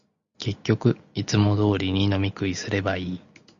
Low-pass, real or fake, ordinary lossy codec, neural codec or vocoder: 7.2 kHz; real; Opus, 64 kbps; none